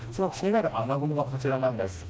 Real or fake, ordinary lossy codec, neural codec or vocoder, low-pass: fake; none; codec, 16 kHz, 1 kbps, FreqCodec, smaller model; none